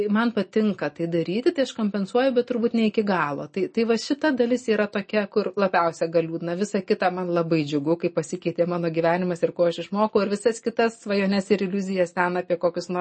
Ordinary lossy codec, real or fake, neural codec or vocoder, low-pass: MP3, 32 kbps; real; none; 9.9 kHz